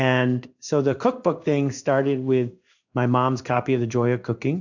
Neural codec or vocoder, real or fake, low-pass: codec, 16 kHz in and 24 kHz out, 1 kbps, XY-Tokenizer; fake; 7.2 kHz